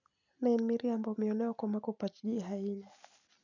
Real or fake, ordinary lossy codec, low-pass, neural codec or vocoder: real; none; 7.2 kHz; none